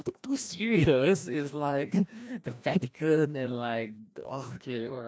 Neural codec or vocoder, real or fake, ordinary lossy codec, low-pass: codec, 16 kHz, 1 kbps, FreqCodec, larger model; fake; none; none